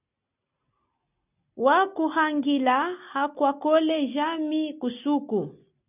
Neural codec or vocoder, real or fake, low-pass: none; real; 3.6 kHz